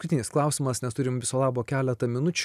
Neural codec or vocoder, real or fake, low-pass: none; real; 14.4 kHz